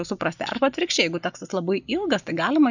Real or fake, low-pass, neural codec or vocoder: real; 7.2 kHz; none